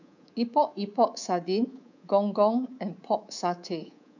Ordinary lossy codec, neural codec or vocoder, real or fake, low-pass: none; codec, 24 kHz, 3.1 kbps, DualCodec; fake; 7.2 kHz